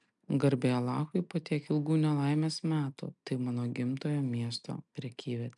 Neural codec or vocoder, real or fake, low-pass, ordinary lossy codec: none; real; 10.8 kHz; MP3, 96 kbps